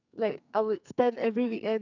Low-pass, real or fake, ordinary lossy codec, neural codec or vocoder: 7.2 kHz; fake; none; codec, 44.1 kHz, 2.6 kbps, SNAC